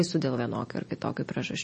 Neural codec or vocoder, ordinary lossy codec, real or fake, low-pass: none; MP3, 32 kbps; real; 10.8 kHz